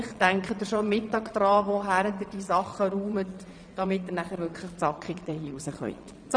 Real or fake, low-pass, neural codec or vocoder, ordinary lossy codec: fake; 9.9 kHz; vocoder, 22.05 kHz, 80 mel bands, Vocos; none